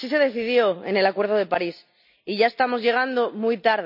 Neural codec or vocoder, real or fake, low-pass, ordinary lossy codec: none; real; 5.4 kHz; none